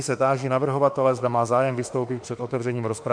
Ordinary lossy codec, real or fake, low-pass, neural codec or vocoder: MP3, 64 kbps; fake; 9.9 kHz; autoencoder, 48 kHz, 32 numbers a frame, DAC-VAE, trained on Japanese speech